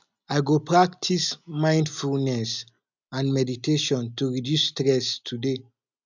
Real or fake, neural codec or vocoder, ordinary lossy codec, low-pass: real; none; none; 7.2 kHz